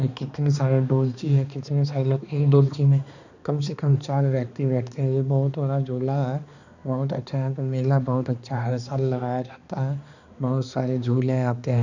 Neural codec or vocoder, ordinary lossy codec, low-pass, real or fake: codec, 16 kHz, 2 kbps, X-Codec, HuBERT features, trained on balanced general audio; none; 7.2 kHz; fake